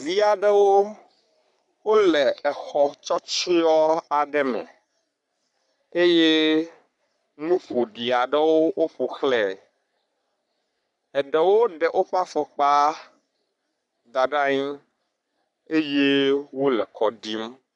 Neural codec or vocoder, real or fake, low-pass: codec, 44.1 kHz, 3.4 kbps, Pupu-Codec; fake; 10.8 kHz